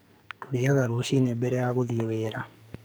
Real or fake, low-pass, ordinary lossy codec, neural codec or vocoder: fake; none; none; codec, 44.1 kHz, 2.6 kbps, SNAC